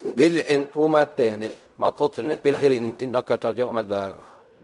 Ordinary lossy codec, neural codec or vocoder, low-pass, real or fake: none; codec, 16 kHz in and 24 kHz out, 0.4 kbps, LongCat-Audio-Codec, fine tuned four codebook decoder; 10.8 kHz; fake